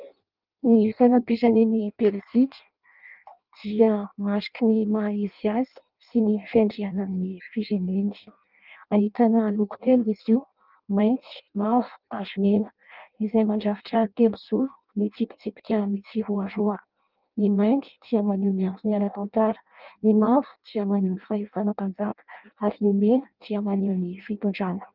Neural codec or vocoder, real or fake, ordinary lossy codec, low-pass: codec, 16 kHz in and 24 kHz out, 0.6 kbps, FireRedTTS-2 codec; fake; Opus, 24 kbps; 5.4 kHz